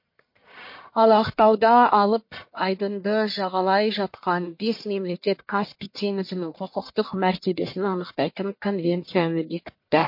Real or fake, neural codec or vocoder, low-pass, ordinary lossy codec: fake; codec, 44.1 kHz, 1.7 kbps, Pupu-Codec; 5.4 kHz; MP3, 32 kbps